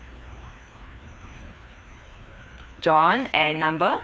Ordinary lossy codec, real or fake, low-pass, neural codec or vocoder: none; fake; none; codec, 16 kHz, 2 kbps, FreqCodec, larger model